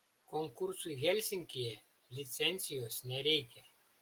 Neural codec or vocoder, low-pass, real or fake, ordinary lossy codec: none; 19.8 kHz; real; Opus, 24 kbps